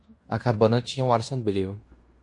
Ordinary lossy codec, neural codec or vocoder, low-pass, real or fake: MP3, 48 kbps; codec, 16 kHz in and 24 kHz out, 0.9 kbps, LongCat-Audio-Codec, fine tuned four codebook decoder; 10.8 kHz; fake